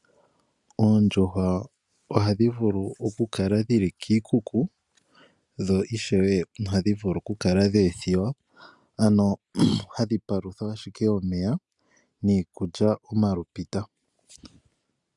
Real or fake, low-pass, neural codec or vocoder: real; 10.8 kHz; none